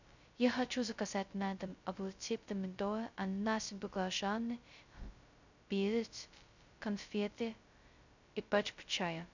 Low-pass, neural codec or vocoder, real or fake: 7.2 kHz; codec, 16 kHz, 0.2 kbps, FocalCodec; fake